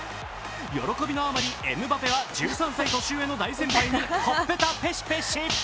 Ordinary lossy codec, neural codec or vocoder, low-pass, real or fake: none; none; none; real